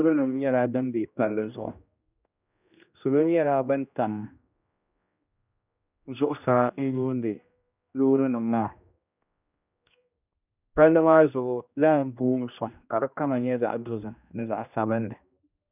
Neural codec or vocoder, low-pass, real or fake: codec, 16 kHz, 1 kbps, X-Codec, HuBERT features, trained on general audio; 3.6 kHz; fake